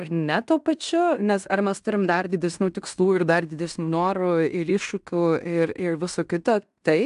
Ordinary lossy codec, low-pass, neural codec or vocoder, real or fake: AAC, 96 kbps; 10.8 kHz; codec, 16 kHz in and 24 kHz out, 0.9 kbps, LongCat-Audio-Codec, fine tuned four codebook decoder; fake